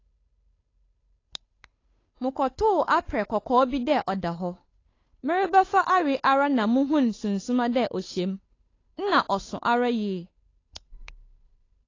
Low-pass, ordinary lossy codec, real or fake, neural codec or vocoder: 7.2 kHz; AAC, 32 kbps; fake; codec, 16 kHz, 8 kbps, FunCodec, trained on Chinese and English, 25 frames a second